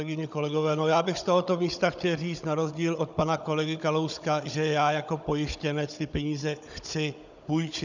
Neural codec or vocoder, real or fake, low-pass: codec, 16 kHz, 16 kbps, FunCodec, trained on Chinese and English, 50 frames a second; fake; 7.2 kHz